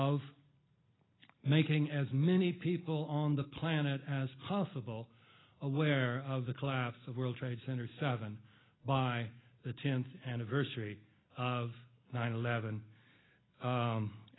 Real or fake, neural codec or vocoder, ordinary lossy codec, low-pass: real; none; AAC, 16 kbps; 7.2 kHz